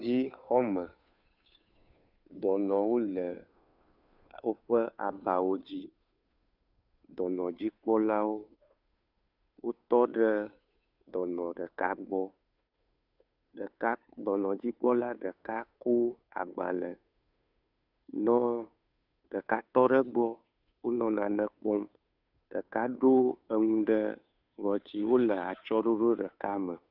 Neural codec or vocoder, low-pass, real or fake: codec, 16 kHz, 2 kbps, FunCodec, trained on Chinese and English, 25 frames a second; 5.4 kHz; fake